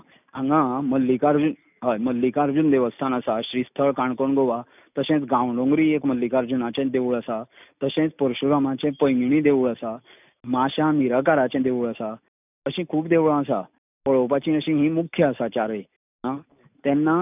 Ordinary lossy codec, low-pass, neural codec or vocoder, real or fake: none; 3.6 kHz; none; real